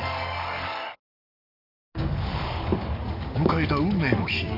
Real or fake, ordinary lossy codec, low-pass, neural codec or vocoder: fake; none; 5.4 kHz; codec, 44.1 kHz, 7.8 kbps, DAC